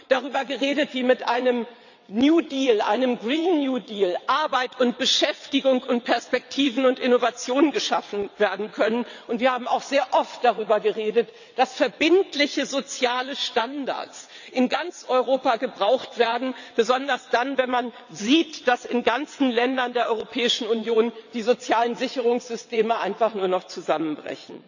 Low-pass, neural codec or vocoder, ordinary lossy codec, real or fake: 7.2 kHz; vocoder, 22.05 kHz, 80 mel bands, WaveNeXt; none; fake